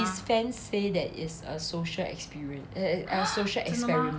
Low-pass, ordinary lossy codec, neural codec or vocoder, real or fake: none; none; none; real